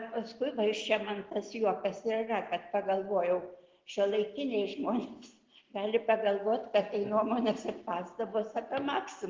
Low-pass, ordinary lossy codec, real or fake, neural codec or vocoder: 7.2 kHz; Opus, 16 kbps; real; none